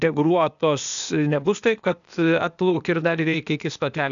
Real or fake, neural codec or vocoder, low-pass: fake; codec, 16 kHz, 0.8 kbps, ZipCodec; 7.2 kHz